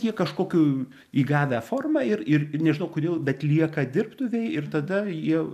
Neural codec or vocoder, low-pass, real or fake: none; 14.4 kHz; real